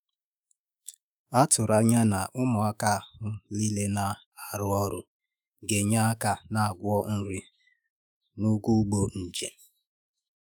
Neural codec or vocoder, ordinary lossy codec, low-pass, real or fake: autoencoder, 48 kHz, 128 numbers a frame, DAC-VAE, trained on Japanese speech; none; none; fake